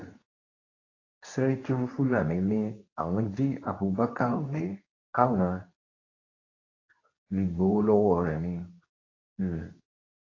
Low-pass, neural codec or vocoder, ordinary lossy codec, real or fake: 7.2 kHz; codec, 24 kHz, 0.9 kbps, WavTokenizer, medium speech release version 2; AAC, 32 kbps; fake